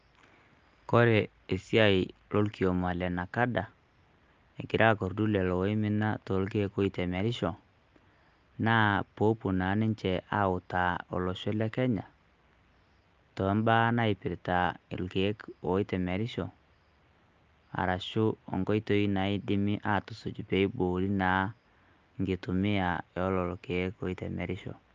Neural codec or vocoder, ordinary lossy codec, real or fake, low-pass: none; Opus, 24 kbps; real; 7.2 kHz